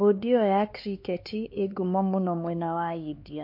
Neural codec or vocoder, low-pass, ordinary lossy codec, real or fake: codec, 16 kHz, 4 kbps, X-Codec, HuBERT features, trained on LibriSpeech; 5.4 kHz; MP3, 32 kbps; fake